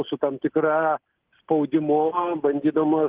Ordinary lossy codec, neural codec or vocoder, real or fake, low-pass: Opus, 16 kbps; none; real; 3.6 kHz